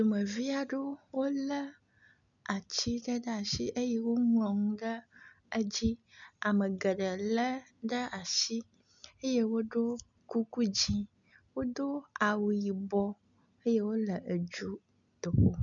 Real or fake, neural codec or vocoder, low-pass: real; none; 7.2 kHz